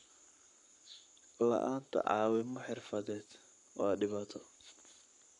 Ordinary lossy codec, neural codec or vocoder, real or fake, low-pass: none; codec, 44.1 kHz, 7.8 kbps, Pupu-Codec; fake; 10.8 kHz